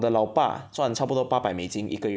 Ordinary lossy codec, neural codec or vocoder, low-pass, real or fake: none; none; none; real